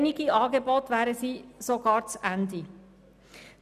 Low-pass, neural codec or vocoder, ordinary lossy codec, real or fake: 14.4 kHz; none; none; real